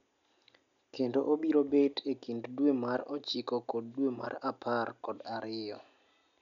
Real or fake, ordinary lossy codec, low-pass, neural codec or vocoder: real; none; 7.2 kHz; none